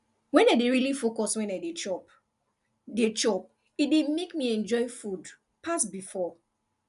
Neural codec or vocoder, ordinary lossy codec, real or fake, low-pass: none; none; real; 10.8 kHz